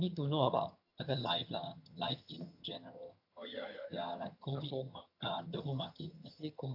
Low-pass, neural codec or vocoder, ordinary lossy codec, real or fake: 5.4 kHz; vocoder, 22.05 kHz, 80 mel bands, HiFi-GAN; AAC, 48 kbps; fake